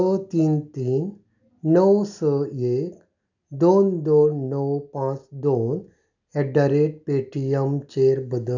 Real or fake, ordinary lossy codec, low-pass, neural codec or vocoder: real; none; 7.2 kHz; none